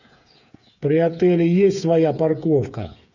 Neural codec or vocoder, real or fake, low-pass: codec, 16 kHz, 8 kbps, FreqCodec, smaller model; fake; 7.2 kHz